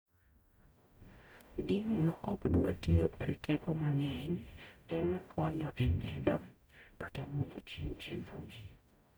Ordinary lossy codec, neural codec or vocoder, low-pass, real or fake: none; codec, 44.1 kHz, 0.9 kbps, DAC; none; fake